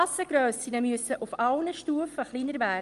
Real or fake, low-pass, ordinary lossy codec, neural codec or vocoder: real; 10.8 kHz; Opus, 32 kbps; none